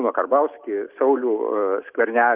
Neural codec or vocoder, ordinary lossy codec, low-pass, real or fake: none; Opus, 24 kbps; 3.6 kHz; real